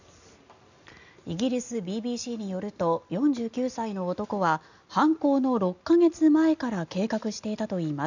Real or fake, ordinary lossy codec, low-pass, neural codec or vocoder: real; none; 7.2 kHz; none